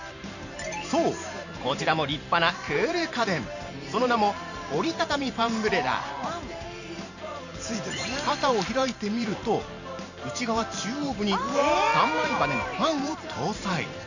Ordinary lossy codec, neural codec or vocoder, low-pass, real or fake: none; vocoder, 44.1 kHz, 128 mel bands every 512 samples, BigVGAN v2; 7.2 kHz; fake